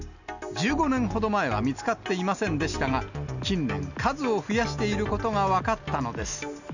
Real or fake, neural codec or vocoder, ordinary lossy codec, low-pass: real; none; none; 7.2 kHz